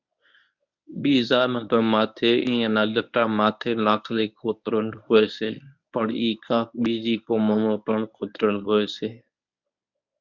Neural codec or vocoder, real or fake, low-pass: codec, 24 kHz, 0.9 kbps, WavTokenizer, medium speech release version 1; fake; 7.2 kHz